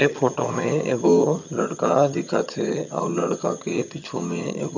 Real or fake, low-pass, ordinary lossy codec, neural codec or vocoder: fake; 7.2 kHz; none; vocoder, 22.05 kHz, 80 mel bands, HiFi-GAN